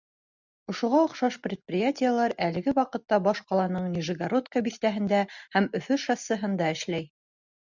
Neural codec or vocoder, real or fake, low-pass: none; real; 7.2 kHz